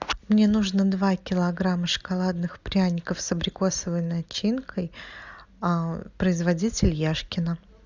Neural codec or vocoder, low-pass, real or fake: none; 7.2 kHz; real